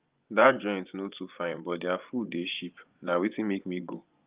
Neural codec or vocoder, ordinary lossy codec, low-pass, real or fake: vocoder, 24 kHz, 100 mel bands, Vocos; Opus, 32 kbps; 3.6 kHz; fake